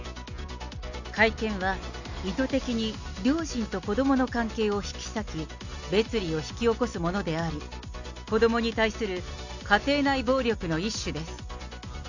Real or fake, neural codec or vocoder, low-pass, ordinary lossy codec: real; none; 7.2 kHz; none